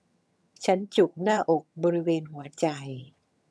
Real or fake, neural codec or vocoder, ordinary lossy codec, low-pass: fake; vocoder, 22.05 kHz, 80 mel bands, HiFi-GAN; none; none